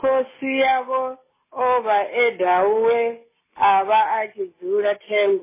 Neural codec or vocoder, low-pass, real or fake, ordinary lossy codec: none; 3.6 kHz; real; MP3, 16 kbps